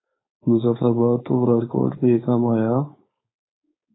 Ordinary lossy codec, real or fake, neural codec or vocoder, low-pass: AAC, 16 kbps; fake; codec, 16 kHz, 4.8 kbps, FACodec; 7.2 kHz